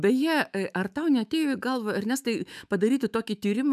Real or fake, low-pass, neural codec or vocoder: fake; 14.4 kHz; autoencoder, 48 kHz, 128 numbers a frame, DAC-VAE, trained on Japanese speech